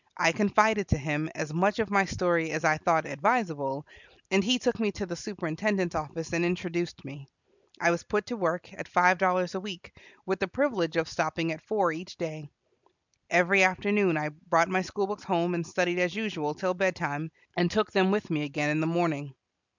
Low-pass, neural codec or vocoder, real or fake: 7.2 kHz; none; real